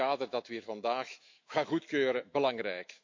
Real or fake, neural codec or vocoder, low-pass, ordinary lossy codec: fake; autoencoder, 48 kHz, 128 numbers a frame, DAC-VAE, trained on Japanese speech; 7.2 kHz; MP3, 48 kbps